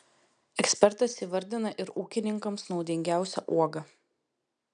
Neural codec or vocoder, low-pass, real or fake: none; 9.9 kHz; real